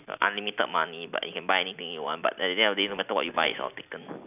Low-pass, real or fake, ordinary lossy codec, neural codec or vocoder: 3.6 kHz; real; none; none